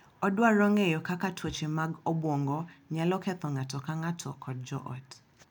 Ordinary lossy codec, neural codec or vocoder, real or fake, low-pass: none; none; real; 19.8 kHz